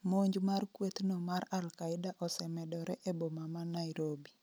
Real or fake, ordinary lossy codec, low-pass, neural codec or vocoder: real; none; none; none